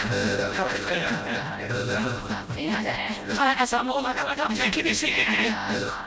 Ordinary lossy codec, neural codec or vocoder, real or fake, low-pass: none; codec, 16 kHz, 0.5 kbps, FreqCodec, smaller model; fake; none